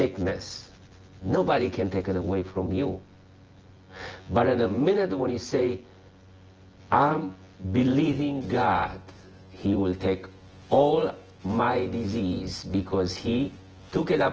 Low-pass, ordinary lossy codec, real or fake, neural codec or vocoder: 7.2 kHz; Opus, 16 kbps; fake; vocoder, 24 kHz, 100 mel bands, Vocos